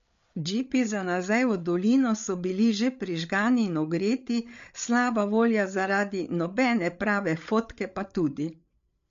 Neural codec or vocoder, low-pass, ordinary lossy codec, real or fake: codec, 16 kHz, 8 kbps, FreqCodec, larger model; 7.2 kHz; MP3, 48 kbps; fake